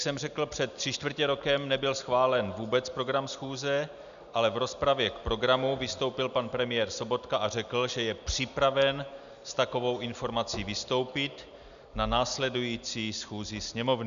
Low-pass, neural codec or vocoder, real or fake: 7.2 kHz; none; real